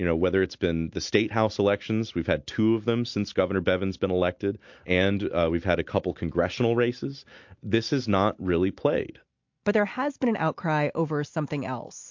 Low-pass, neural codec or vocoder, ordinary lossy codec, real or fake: 7.2 kHz; none; MP3, 48 kbps; real